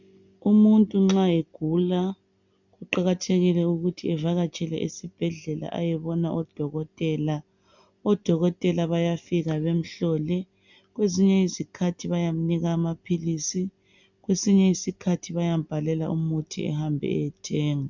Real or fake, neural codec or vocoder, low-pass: real; none; 7.2 kHz